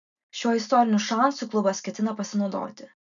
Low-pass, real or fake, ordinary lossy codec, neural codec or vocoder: 7.2 kHz; real; MP3, 96 kbps; none